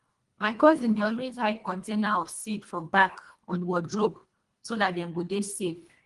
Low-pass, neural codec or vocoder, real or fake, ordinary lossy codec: 10.8 kHz; codec, 24 kHz, 1.5 kbps, HILCodec; fake; Opus, 24 kbps